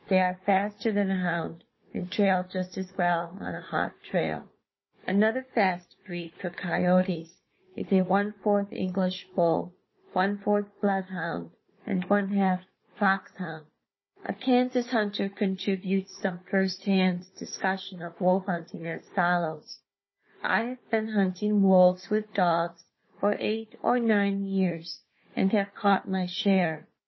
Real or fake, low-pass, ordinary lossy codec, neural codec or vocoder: fake; 7.2 kHz; MP3, 24 kbps; codec, 16 kHz, 4 kbps, FunCodec, trained on Chinese and English, 50 frames a second